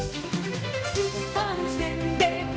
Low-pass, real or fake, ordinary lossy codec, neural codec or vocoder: none; fake; none; codec, 16 kHz, 1 kbps, X-Codec, HuBERT features, trained on balanced general audio